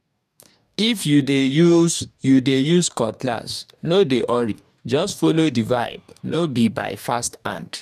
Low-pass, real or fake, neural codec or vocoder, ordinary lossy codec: 14.4 kHz; fake; codec, 44.1 kHz, 2.6 kbps, DAC; none